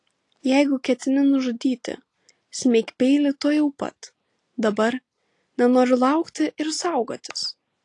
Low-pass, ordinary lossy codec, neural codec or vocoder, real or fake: 10.8 kHz; AAC, 48 kbps; none; real